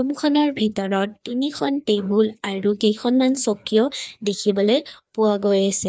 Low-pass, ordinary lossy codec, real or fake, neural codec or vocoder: none; none; fake; codec, 16 kHz, 2 kbps, FreqCodec, larger model